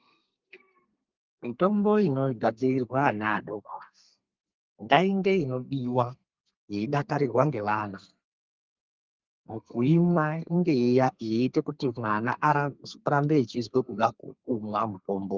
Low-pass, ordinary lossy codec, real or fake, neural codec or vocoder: 7.2 kHz; Opus, 32 kbps; fake; codec, 32 kHz, 1.9 kbps, SNAC